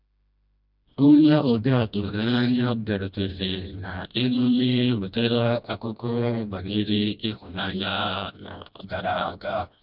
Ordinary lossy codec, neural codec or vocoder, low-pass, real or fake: none; codec, 16 kHz, 1 kbps, FreqCodec, smaller model; 5.4 kHz; fake